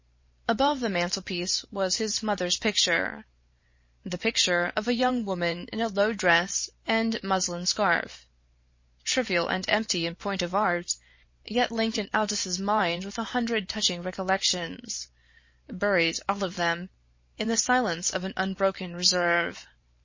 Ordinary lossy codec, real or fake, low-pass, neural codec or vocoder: MP3, 32 kbps; fake; 7.2 kHz; vocoder, 44.1 kHz, 128 mel bands every 512 samples, BigVGAN v2